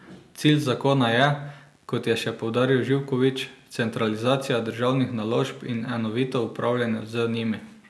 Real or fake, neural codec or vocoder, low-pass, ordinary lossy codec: real; none; none; none